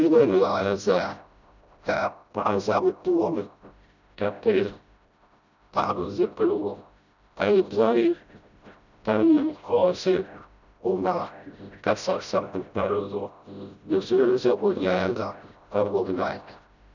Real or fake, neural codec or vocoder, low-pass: fake; codec, 16 kHz, 0.5 kbps, FreqCodec, smaller model; 7.2 kHz